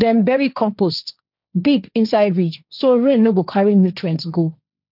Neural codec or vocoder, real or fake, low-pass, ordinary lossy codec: codec, 16 kHz, 1.1 kbps, Voila-Tokenizer; fake; 5.4 kHz; none